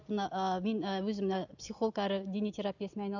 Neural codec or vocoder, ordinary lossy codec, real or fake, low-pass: none; none; real; 7.2 kHz